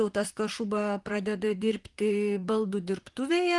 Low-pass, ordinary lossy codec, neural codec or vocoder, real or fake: 10.8 kHz; Opus, 24 kbps; vocoder, 44.1 kHz, 128 mel bands, Pupu-Vocoder; fake